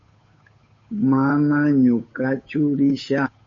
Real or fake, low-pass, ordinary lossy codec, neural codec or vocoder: fake; 7.2 kHz; MP3, 32 kbps; codec, 16 kHz, 8 kbps, FunCodec, trained on Chinese and English, 25 frames a second